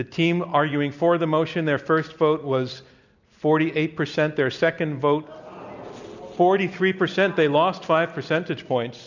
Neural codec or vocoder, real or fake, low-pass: none; real; 7.2 kHz